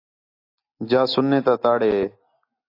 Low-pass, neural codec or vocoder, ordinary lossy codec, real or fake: 5.4 kHz; none; AAC, 32 kbps; real